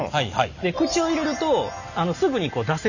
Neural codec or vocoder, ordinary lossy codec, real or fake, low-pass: none; none; real; 7.2 kHz